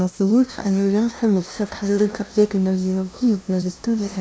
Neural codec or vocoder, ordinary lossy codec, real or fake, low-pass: codec, 16 kHz, 0.5 kbps, FunCodec, trained on LibriTTS, 25 frames a second; none; fake; none